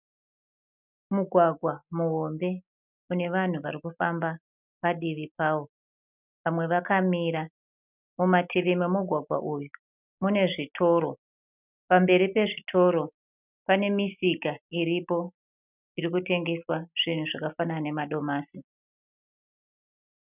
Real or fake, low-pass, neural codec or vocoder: real; 3.6 kHz; none